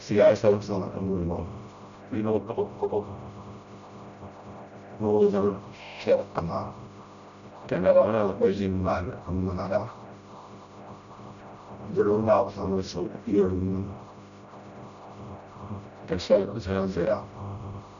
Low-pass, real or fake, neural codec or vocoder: 7.2 kHz; fake; codec, 16 kHz, 0.5 kbps, FreqCodec, smaller model